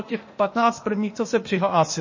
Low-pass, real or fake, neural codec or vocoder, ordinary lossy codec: 7.2 kHz; fake; codec, 16 kHz, 0.8 kbps, ZipCodec; MP3, 32 kbps